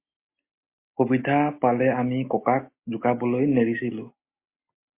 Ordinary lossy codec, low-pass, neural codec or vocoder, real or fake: MP3, 24 kbps; 3.6 kHz; none; real